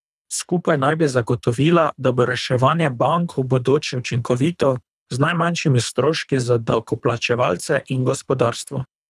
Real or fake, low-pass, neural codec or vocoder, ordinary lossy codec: fake; none; codec, 24 kHz, 3 kbps, HILCodec; none